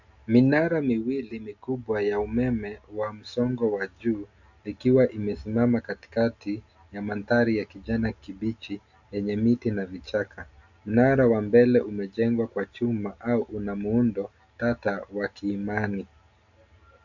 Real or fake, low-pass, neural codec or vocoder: real; 7.2 kHz; none